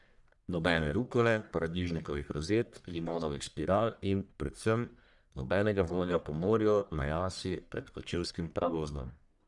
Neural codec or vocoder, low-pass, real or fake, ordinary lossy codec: codec, 44.1 kHz, 1.7 kbps, Pupu-Codec; 10.8 kHz; fake; none